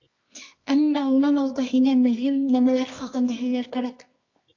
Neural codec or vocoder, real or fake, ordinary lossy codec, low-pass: codec, 24 kHz, 0.9 kbps, WavTokenizer, medium music audio release; fake; AAC, 48 kbps; 7.2 kHz